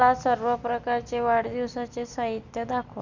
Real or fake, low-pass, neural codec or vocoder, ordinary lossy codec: real; 7.2 kHz; none; none